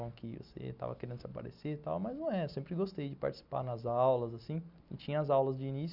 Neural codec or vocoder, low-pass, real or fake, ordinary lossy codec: none; 5.4 kHz; real; none